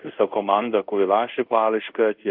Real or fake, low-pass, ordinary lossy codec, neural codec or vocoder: fake; 5.4 kHz; Opus, 24 kbps; codec, 24 kHz, 0.5 kbps, DualCodec